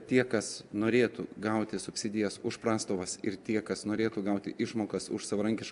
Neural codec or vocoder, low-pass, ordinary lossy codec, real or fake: none; 10.8 kHz; AAC, 96 kbps; real